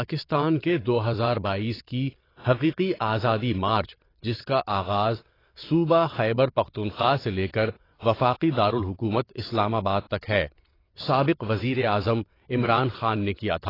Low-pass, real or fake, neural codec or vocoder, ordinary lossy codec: 5.4 kHz; fake; vocoder, 22.05 kHz, 80 mel bands, WaveNeXt; AAC, 24 kbps